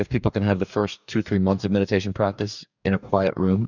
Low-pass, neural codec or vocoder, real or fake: 7.2 kHz; codec, 44.1 kHz, 2.6 kbps, DAC; fake